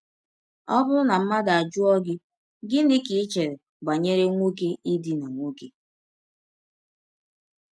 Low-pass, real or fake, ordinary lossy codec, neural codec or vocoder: none; real; none; none